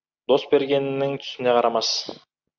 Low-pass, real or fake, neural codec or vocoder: 7.2 kHz; real; none